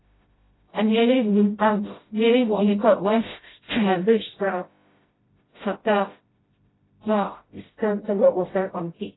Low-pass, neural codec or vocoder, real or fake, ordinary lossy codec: 7.2 kHz; codec, 16 kHz, 0.5 kbps, FreqCodec, smaller model; fake; AAC, 16 kbps